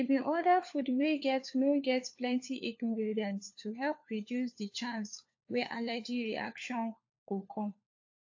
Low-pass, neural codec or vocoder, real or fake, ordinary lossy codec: 7.2 kHz; codec, 16 kHz, 4 kbps, FunCodec, trained on LibriTTS, 50 frames a second; fake; AAC, 48 kbps